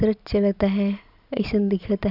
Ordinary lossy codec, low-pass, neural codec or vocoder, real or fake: none; 5.4 kHz; none; real